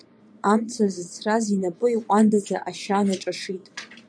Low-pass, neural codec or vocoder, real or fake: 9.9 kHz; vocoder, 22.05 kHz, 80 mel bands, Vocos; fake